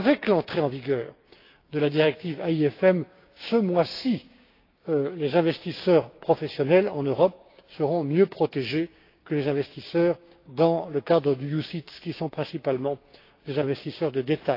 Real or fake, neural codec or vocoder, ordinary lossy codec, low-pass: fake; codec, 16 kHz, 6 kbps, DAC; AAC, 32 kbps; 5.4 kHz